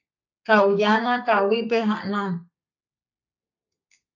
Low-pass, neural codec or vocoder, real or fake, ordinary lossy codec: 7.2 kHz; codec, 32 kHz, 1.9 kbps, SNAC; fake; AAC, 48 kbps